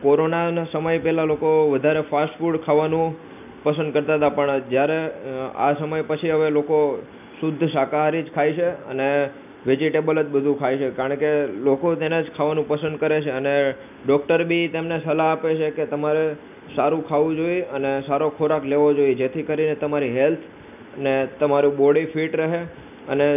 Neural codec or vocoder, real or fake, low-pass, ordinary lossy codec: none; real; 3.6 kHz; none